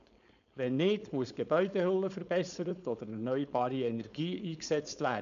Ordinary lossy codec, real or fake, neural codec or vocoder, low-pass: none; fake; codec, 16 kHz, 4.8 kbps, FACodec; 7.2 kHz